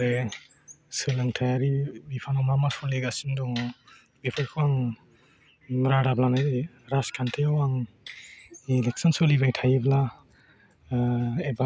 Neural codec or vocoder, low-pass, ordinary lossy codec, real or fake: none; none; none; real